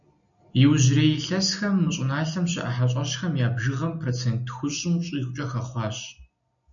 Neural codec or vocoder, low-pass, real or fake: none; 7.2 kHz; real